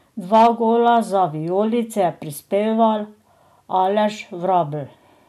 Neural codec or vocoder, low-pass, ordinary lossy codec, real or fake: none; 14.4 kHz; none; real